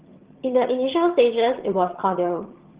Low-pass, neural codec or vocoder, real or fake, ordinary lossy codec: 3.6 kHz; vocoder, 22.05 kHz, 80 mel bands, HiFi-GAN; fake; Opus, 16 kbps